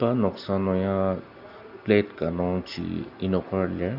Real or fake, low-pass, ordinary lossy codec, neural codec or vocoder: real; 5.4 kHz; none; none